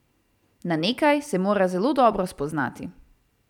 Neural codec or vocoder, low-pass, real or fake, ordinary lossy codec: vocoder, 44.1 kHz, 128 mel bands every 256 samples, BigVGAN v2; 19.8 kHz; fake; none